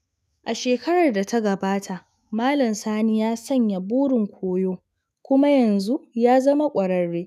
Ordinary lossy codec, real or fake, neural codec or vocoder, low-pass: none; fake; autoencoder, 48 kHz, 128 numbers a frame, DAC-VAE, trained on Japanese speech; 14.4 kHz